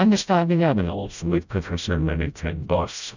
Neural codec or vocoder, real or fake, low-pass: codec, 16 kHz, 0.5 kbps, FreqCodec, smaller model; fake; 7.2 kHz